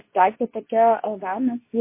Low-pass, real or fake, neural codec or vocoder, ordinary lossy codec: 3.6 kHz; fake; codec, 24 kHz, 0.9 kbps, WavTokenizer, medium speech release version 2; MP3, 24 kbps